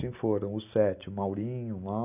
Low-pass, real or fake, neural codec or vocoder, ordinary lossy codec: 3.6 kHz; real; none; none